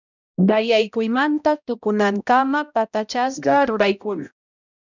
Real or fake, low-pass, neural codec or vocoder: fake; 7.2 kHz; codec, 16 kHz, 0.5 kbps, X-Codec, HuBERT features, trained on balanced general audio